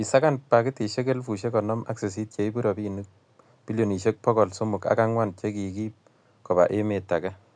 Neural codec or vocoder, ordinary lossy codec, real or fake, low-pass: none; none; real; 9.9 kHz